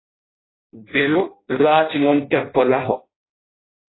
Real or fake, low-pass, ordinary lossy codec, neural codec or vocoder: fake; 7.2 kHz; AAC, 16 kbps; codec, 16 kHz in and 24 kHz out, 0.6 kbps, FireRedTTS-2 codec